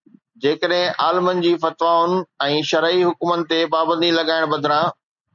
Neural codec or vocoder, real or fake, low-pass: none; real; 7.2 kHz